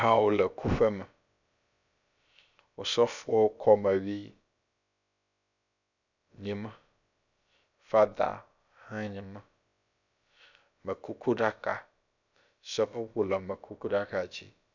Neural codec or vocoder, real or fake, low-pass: codec, 16 kHz, about 1 kbps, DyCAST, with the encoder's durations; fake; 7.2 kHz